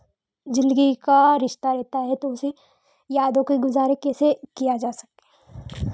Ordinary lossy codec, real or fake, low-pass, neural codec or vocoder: none; real; none; none